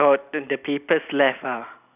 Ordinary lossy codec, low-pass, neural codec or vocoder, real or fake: none; 3.6 kHz; none; real